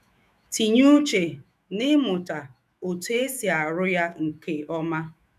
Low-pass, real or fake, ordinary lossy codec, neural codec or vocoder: 14.4 kHz; fake; none; autoencoder, 48 kHz, 128 numbers a frame, DAC-VAE, trained on Japanese speech